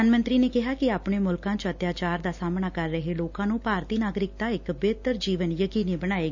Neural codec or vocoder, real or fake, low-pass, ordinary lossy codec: none; real; 7.2 kHz; none